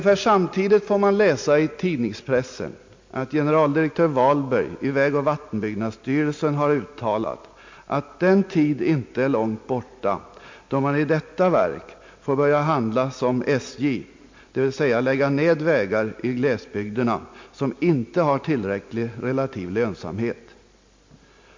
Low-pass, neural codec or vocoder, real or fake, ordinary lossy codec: 7.2 kHz; none; real; MP3, 48 kbps